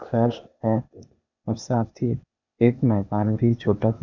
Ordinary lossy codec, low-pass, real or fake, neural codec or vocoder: none; 7.2 kHz; fake; codec, 16 kHz, 0.8 kbps, ZipCodec